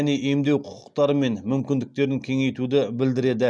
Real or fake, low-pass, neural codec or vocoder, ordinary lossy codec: real; none; none; none